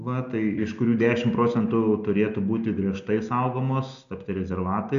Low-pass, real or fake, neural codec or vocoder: 7.2 kHz; real; none